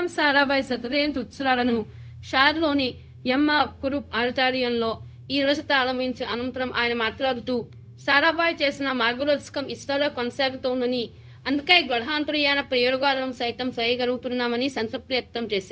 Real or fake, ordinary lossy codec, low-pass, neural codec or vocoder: fake; none; none; codec, 16 kHz, 0.4 kbps, LongCat-Audio-Codec